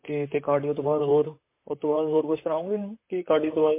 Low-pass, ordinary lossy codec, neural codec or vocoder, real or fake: 3.6 kHz; MP3, 24 kbps; vocoder, 44.1 kHz, 128 mel bands, Pupu-Vocoder; fake